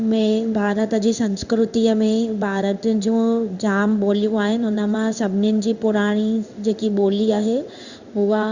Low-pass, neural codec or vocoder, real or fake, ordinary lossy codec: 7.2 kHz; codec, 16 kHz in and 24 kHz out, 1 kbps, XY-Tokenizer; fake; Opus, 64 kbps